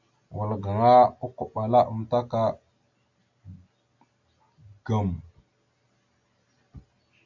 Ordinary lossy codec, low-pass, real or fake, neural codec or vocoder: MP3, 64 kbps; 7.2 kHz; real; none